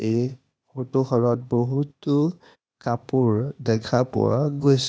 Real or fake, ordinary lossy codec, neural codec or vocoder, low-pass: fake; none; codec, 16 kHz, 0.8 kbps, ZipCodec; none